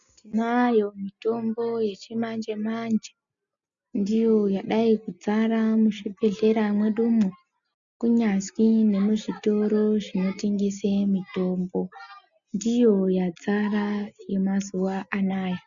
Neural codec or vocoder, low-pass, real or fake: none; 7.2 kHz; real